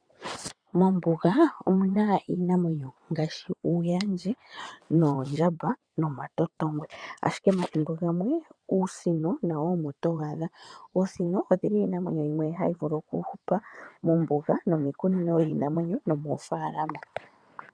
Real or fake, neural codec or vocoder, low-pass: fake; vocoder, 22.05 kHz, 80 mel bands, WaveNeXt; 9.9 kHz